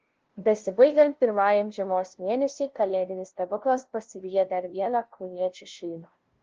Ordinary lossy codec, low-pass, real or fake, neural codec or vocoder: Opus, 16 kbps; 7.2 kHz; fake; codec, 16 kHz, 0.5 kbps, FunCodec, trained on LibriTTS, 25 frames a second